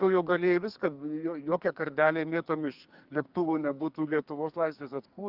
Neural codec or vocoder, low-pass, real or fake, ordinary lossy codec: codec, 44.1 kHz, 2.6 kbps, SNAC; 5.4 kHz; fake; Opus, 32 kbps